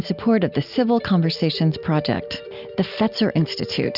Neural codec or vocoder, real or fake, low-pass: none; real; 5.4 kHz